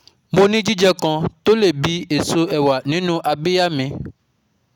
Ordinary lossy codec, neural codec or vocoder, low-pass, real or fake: none; none; 19.8 kHz; real